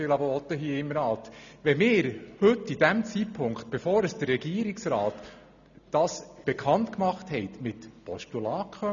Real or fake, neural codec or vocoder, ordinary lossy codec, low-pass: real; none; none; 7.2 kHz